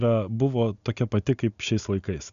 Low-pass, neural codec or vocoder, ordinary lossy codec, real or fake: 7.2 kHz; none; MP3, 96 kbps; real